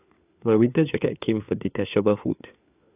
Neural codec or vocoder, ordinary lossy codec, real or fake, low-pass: codec, 16 kHz, 4 kbps, FunCodec, trained on LibriTTS, 50 frames a second; AAC, 32 kbps; fake; 3.6 kHz